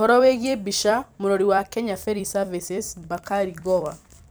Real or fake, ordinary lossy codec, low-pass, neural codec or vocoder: fake; none; none; vocoder, 44.1 kHz, 128 mel bands every 256 samples, BigVGAN v2